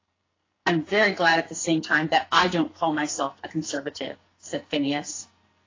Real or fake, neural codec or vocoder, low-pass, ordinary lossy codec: fake; codec, 44.1 kHz, 2.6 kbps, SNAC; 7.2 kHz; AAC, 32 kbps